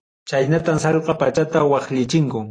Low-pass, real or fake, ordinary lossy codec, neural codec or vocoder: 9.9 kHz; fake; AAC, 32 kbps; vocoder, 44.1 kHz, 128 mel bands every 512 samples, BigVGAN v2